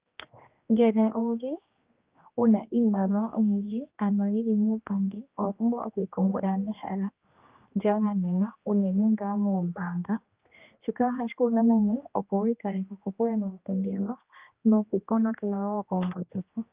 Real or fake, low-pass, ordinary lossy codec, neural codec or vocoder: fake; 3.6 kHz; Opus, 24 kbps; codec, 16 kHz, 1 kbps, X-Codec, HuBERT features, trained on general audio